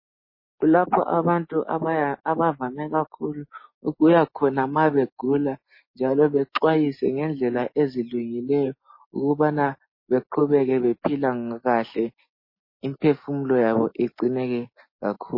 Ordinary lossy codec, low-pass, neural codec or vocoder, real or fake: MP3, 24 kbps; 5.4 kHz; none; real